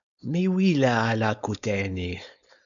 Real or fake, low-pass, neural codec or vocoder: fake; 7.2 kHz; codec, 16 kHz, 4.8 kbps, FACodec